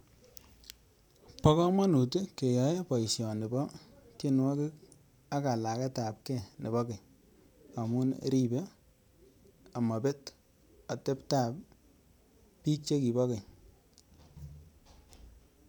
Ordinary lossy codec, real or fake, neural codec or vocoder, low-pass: none; fake; vocoder, 44.1 kHz, 128 mel bands every 512 samples, BigVGAN v2; none